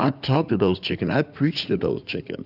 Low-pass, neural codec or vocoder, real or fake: 5.4 kHz; codec, 16 kHz in and 24 kHz out, 2.2 kbps, FireRedTTS-2 codec; fake